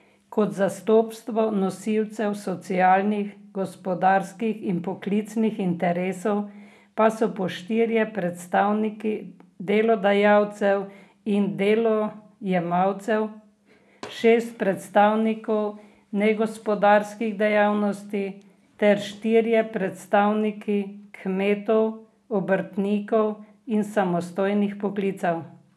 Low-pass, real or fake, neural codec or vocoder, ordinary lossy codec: none; real; none; none